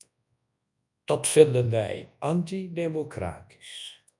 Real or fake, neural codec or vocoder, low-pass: fake; codec, 24 kHz, 0.9 kbps, WavTokenizer, large speech release; 10.8 kHz